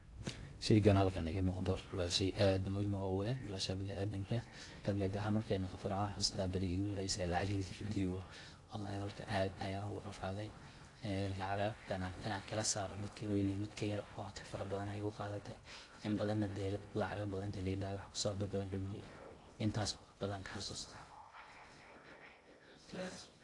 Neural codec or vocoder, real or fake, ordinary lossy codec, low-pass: codec, 16 kHz in and 24 kHz out, 0.6 kbps, FocalCodec, streaming, 2048 codes; fake; AAC, 48 kbps; 10.8 kHz